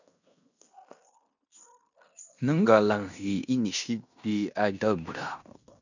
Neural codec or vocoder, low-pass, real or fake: codec, 16 kHz in and 24 kHz out, 0.9 kbps, LongCat-Audio-Codec, fine tuned four codebook decoder; 7.2 kHz; fake